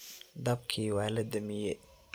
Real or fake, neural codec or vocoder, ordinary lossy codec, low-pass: real; none; none; none